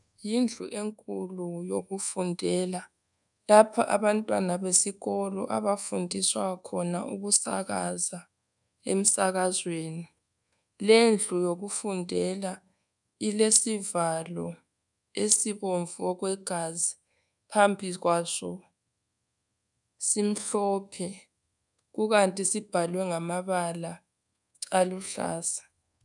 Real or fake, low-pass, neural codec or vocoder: fake; 10.8 kHz; codec, 24 kHz, 1.2 kbps, DualCodec